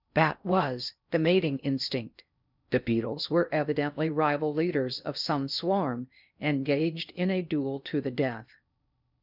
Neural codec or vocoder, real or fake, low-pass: codec, 16 kHz in and 24 kHz out, 0.6 kbps, FocalCodec, streaming, 4096 codes; fake; 5.4 kHz